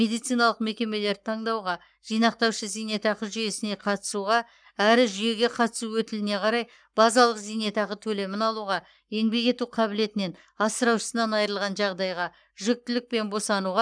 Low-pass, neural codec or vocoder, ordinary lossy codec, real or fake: 9.9 kHz; codec, 44.1 kHz, 7.8 kbps, Pupu-Codec; none; fake